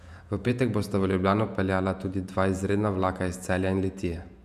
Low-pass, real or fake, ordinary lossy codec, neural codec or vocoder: 14.4 kHz; fake; none; vocoder, 48 kHz, 128 mel bands, Vocos